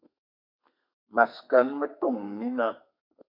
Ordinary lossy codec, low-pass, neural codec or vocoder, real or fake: MP3, 48 kbps; 5.4 kHz; codec, 32 kHz, 1.9 kbps, SNAC; fake